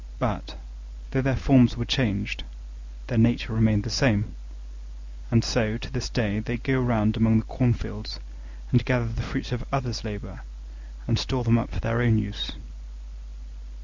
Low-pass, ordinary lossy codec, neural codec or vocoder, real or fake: 7.2 kHz; MP3, 64 kbps; none; real